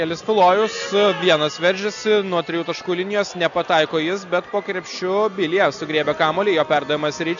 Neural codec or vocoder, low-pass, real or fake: none; 7.2 kHz; real